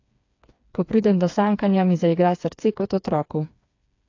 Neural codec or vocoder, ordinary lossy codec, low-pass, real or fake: codec, 16 kHz, 4 kbps, FreqCodec, smaller model; AAC, 48 kbps; 7.2 kHz; fake